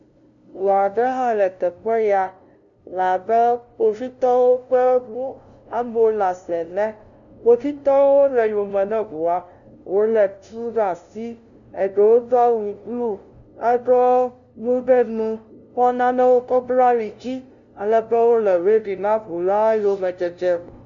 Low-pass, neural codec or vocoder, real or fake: 7.2 kHz; codec, 16 kHz, 0.5 kbps, FunCodec, trained on LibriTTS, 25 frames a second; fake